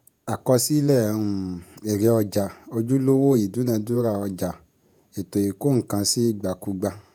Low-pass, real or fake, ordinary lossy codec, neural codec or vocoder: none; fake; none; vocoder, 48 kHz, 128 mel bands, Vocos